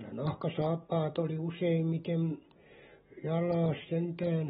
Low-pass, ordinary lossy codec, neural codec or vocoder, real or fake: 9.9 kHz; AAC, 16 kbps; none; real